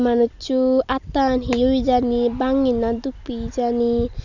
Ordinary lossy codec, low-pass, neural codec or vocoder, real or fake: none; 7.2 kHz; none; real